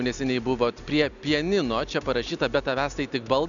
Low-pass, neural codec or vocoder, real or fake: 7.2 kHz; none; real